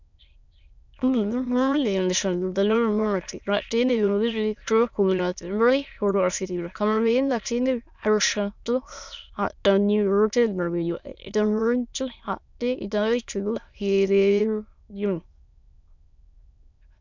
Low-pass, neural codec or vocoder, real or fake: 7.2 kHz; autoencoder, 22.05 kHz, a latent of 192 numbers a frame, VITS, trained on many speakers; fake